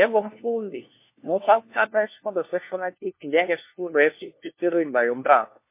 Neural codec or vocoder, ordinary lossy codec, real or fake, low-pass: codec, 16 kHz, 1 kbps, FunCodec, trained on LibriTTS, 50 frames a second; MP3, 24 kbps; fake; 3.6 kHz